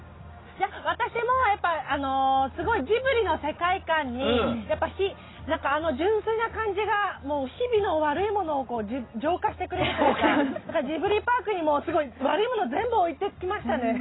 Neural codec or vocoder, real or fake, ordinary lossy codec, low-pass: none; real; AAC, 16 kbps; 7.2 kHz